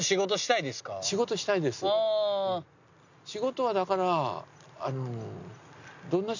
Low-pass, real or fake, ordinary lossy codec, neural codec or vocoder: 7.2 kHz; real; none; none